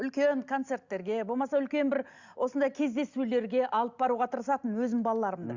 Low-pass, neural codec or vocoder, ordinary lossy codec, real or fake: 7.2 kHz; none; Opus, 64 kbps; real